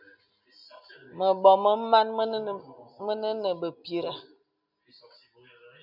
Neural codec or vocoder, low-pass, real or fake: none; 5.4 kHz; real